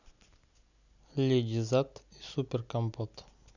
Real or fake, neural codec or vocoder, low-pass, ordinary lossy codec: real; none; 7.2 kHz; none